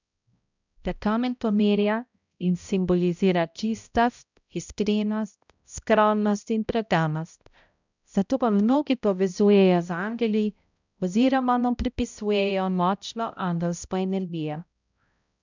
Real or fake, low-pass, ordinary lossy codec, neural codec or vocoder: fake; 7.2 kHz; none; codec, 16 kHz, 0.5 kbps, X-Codec, HuBERT features, trained on balanced general audio